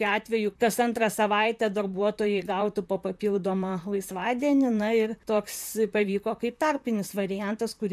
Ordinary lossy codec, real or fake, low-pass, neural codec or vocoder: MP3, 96 kbps; fake; 14.4 kHz; vocoder, 44.1 kHz, 128 mel bands, Pupu-Vocoder